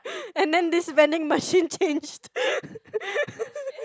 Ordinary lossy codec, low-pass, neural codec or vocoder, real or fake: none; none; none; real